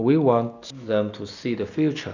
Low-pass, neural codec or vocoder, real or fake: 7.2 kHz; none; real